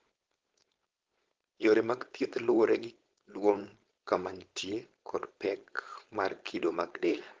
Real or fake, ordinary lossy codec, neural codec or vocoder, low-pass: fake; Opus, 16 kbps; codec, 16 kHz, 4.8 kbps, FACodec; 7.2 kHz